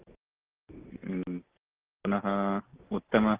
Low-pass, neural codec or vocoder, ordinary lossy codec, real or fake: 3.6 kHz; none; Opus, 16 kbps; real